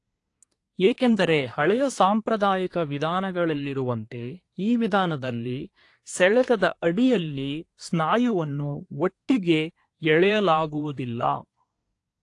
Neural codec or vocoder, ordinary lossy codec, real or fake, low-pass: codec, 24 kHz, 1 kbps, SNAC; AAC, 48 kbps; fake; 10.8 kHz